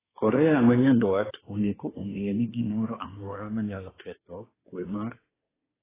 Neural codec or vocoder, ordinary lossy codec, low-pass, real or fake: codec, 24 kHz, 1 kbps, SNAC; AAC, 16 kbps; 3.6 kHz; fake